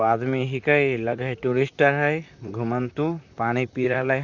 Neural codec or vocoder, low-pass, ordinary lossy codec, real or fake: vocoder, 44.1 kHz, 128 mel bands, Pupu-Vocoder; 7.2 kHz; none; fake